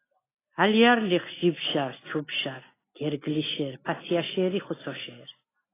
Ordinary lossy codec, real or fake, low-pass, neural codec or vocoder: AAC, 16 kbps; real; 3.6 kHz; none